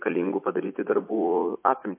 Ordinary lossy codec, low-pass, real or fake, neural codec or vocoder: MP3, 24 kbps; 3.6 kHz; fake; vocoder, 44.1 kHz, 80 mel bands, Vocos